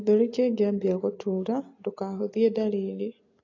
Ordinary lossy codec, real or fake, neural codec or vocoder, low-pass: AAC, 32 kbps; real; none; 7.2 kHz